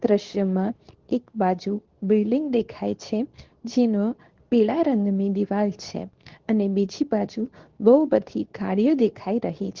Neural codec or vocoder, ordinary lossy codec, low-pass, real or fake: codec, 24 kHz, 0.9 kbps, WavTokenizer, medium speech release version 2; Opus, 16 kbps; 7.2 kHz; fake